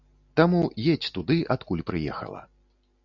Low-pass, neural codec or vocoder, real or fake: 7.2 kHz; none; real